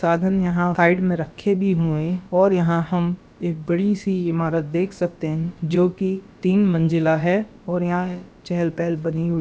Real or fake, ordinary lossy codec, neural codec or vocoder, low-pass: fake; none; codec, 16 kHz, about 1 kbps, DyCAST, with the encoder's durations; none